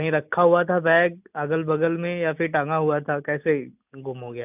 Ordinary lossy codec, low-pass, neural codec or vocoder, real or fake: none; 3.6 kHz; none; real